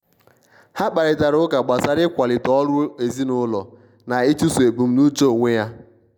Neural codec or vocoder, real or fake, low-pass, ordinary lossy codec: none; real; 19.8 kHz; none